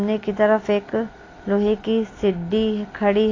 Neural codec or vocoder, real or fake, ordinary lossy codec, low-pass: none; real; AAC, 32 kbps; 7.2 kHz